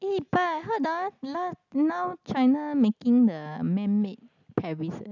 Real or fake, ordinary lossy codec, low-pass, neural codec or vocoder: real; none; 7.2 kHz; none